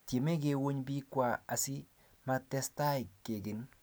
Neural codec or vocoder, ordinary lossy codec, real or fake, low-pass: none; none; real; none